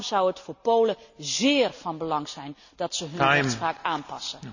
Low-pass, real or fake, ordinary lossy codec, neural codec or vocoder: 7.2 kHz; real; none; none